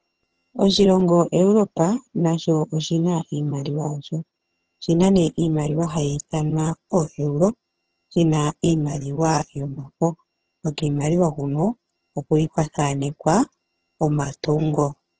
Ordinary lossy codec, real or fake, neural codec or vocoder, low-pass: Opus, 16 kbps; fake; vocoder, 22.05 kHz, 80 mel bands, HiFi-GAN; 7.2 kHz